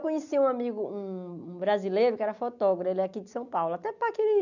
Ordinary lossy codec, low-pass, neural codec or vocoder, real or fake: none; 7.2 kHz; none; real